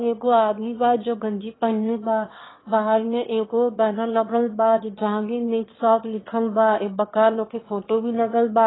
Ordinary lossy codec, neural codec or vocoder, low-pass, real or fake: AAC, 16 kbps; autoencoder, 22.05 kHz, a latent of 192 numbers a frame, VITS, trained on one speaker; 7.2 kHz; fake